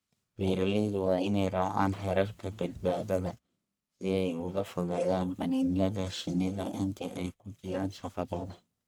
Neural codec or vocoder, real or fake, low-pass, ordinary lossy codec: codec, 44.1 kHz, 1.7 kbps, Pupu-Codec; fake; none; none